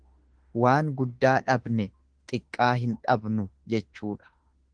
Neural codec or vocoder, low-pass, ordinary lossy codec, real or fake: autoencoder, 48 kHz, 32 numbers a frame, DAC-VAE, trained on Japanese speech; 9.9 kHz; Opus, 16 kbps; fake